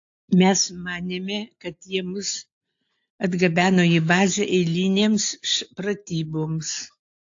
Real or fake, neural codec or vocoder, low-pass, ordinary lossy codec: real; none; 7.2 kHz; AAC, 48 kbps